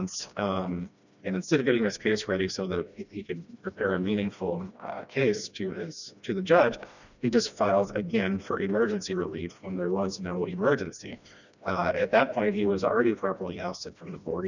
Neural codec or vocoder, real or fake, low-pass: codec, 16 kHz, 1 kbps, FreqCodec, smaller model; fake; 7.2 kHz